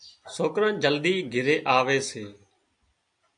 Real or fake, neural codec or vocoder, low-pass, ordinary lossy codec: real; none; 9.9 kHz; AAC, 64 kbps